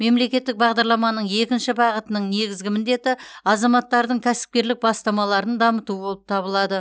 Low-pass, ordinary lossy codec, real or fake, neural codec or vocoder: none; none; real; none